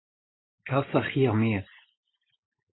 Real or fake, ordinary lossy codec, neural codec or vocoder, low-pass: real; AAC, 16 kbps; none; 7.2 kHz